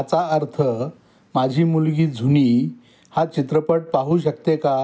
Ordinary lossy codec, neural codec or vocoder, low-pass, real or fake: none; none; none; real